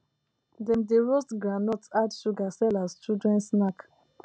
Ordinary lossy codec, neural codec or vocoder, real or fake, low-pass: none; none; real; none